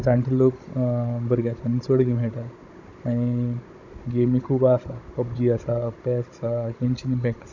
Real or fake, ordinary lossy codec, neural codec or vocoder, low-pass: fake; none; codec, 16 kHz, 16 kbps, FunCodec, trained on Chinese and English, 50 frames a second; 7.2 kHz